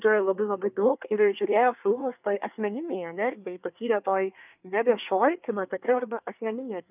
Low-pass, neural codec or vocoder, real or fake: 3.6 kHz; codec, 24 kHz, 1 kbps, SNAC; fake